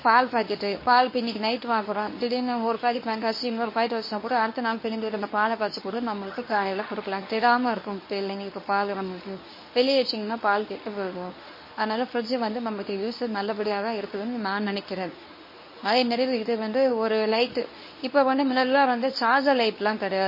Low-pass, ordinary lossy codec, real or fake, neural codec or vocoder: 5.4 kHz; MP3, 24 kbps; fake; codec, 24 kHz, 0.9 kbps, WavTokenizer, medium speech release version 1